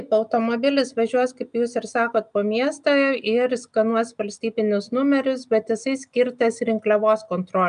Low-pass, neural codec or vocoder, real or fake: 9.9 kHz; none; real